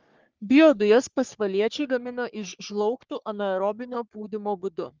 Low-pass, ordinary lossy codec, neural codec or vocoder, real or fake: 7.2 kHz; Opus, 32 kbps; codec, 44.1 kHz, 3.4 kbps, Pupu-Codec; fake